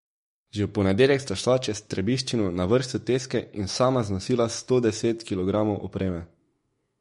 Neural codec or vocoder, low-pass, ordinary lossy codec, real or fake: autoencoder, 48 kHz, 128 numbers a frame, DAC-VAE, trained on Japanese speech; 19.8 kHz; MP3, 48 kbps; fake